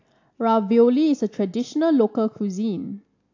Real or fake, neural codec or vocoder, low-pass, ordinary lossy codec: real; none; 7.2 kHz; AAC, 48 kbps